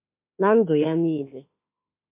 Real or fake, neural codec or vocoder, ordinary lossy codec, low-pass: fake; autoencoder, 48 kHz, 32 numbers a frame, DAC-VAE, trained on Japanese speech; MP3, 32 kbps; 3.6 kHz